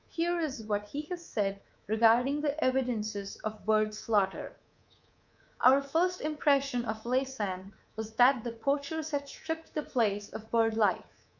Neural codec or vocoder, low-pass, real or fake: codec, 24 kHz, 3.1 kbps, DualCodec; 7.2 kHz; fake